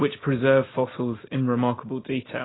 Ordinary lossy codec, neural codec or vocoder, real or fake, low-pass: AAC, 16 kbps; none; real; 7.2 kHz